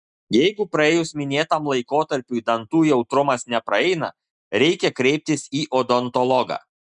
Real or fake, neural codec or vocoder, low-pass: real; none; 10.8 kHz